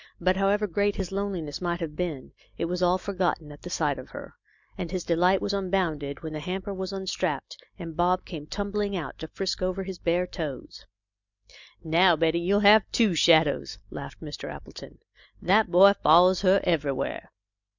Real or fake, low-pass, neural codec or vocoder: real; 7.2 kHz; none